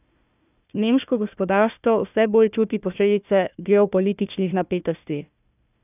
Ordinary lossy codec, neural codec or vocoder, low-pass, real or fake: none; codec, 16 kHz, 1 kbps, FunCodec, trained on Chinese and English, 50 frames a second; 3.6 kHz; fake